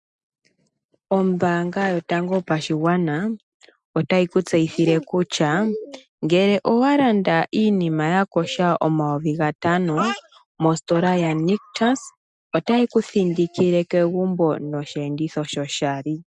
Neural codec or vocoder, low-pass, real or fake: none; 10.8 kHz; real